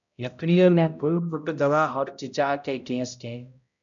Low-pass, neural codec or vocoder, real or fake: 7.2 kHz; codec, 16 kHz, 0.5 kbps, X-Codec, HuBERT features, trained on balanced general audio; fake